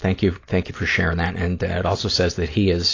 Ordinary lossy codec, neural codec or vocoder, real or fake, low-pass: AAC, 32 kbps; none; real; 7.2 kHz